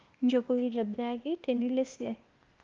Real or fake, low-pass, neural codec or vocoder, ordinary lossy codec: fake; 7.2 kHz; codec, 16 kHz, 0.8 kbps, ZipCodec; Opus, 24 kbps